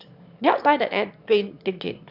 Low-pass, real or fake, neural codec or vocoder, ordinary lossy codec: 5.4 kHz; fake; autoencoder, 22.05 kHz, a latent of 192 numbers a frame, VITS, trained on one speaker; none